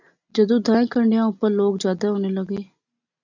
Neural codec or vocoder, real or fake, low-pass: none; real; 7.2 kHz